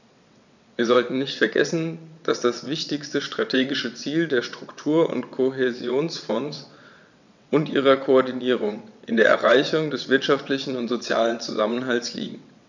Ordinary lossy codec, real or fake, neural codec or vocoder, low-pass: none; fake; vocoder, 22.05 kHz, 80 mel bands, WaveNeXt; 7.2 kHz